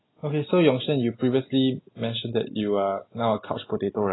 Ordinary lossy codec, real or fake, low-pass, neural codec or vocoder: AAC, 16 kbps; real; 7.2 kHz; none